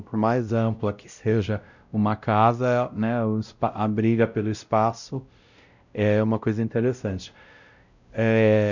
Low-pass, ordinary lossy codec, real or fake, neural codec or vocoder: 7.2 kHz; none; fake; codec, 16 kHz, 0.5 kbps, X-Codec, WavLM features, trained on Multilingual LibriSpeech